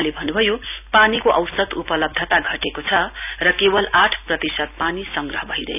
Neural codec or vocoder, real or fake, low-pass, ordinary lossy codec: none; real; 3.6 kHz; none